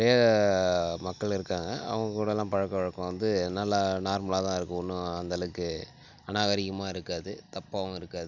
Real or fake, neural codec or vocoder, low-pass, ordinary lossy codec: real; none; 7.2 kHz; none